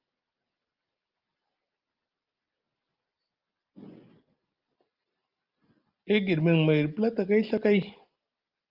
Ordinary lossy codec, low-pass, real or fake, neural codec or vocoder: Opus, 32 kbps; 5.4 kHz; real; none